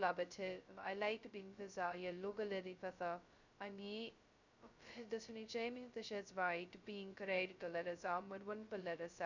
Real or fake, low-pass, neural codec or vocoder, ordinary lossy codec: fake; 7.2 kHz; codec, 16 kHz, 0.2 kbps, FocalCodec; none